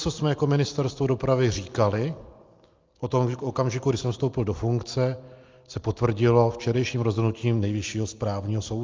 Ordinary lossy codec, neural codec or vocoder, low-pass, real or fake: Opus, 24 kbps; none; 7.2 kHz; real